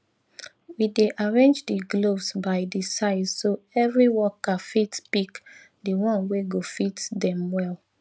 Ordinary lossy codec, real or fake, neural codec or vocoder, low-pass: none; real; none; none